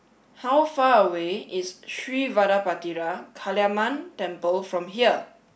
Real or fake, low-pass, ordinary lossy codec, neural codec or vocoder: real; none; none; none